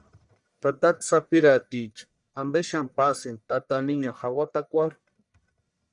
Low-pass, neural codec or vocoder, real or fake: 10.8 kHz; codec, 44.1 kHz, 1.7 kbps, Pupu-Codec; fake